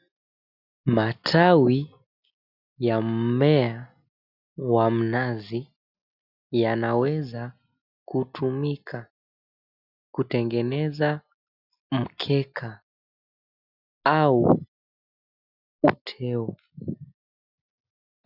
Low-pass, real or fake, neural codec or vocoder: 5.4 kHz; real; none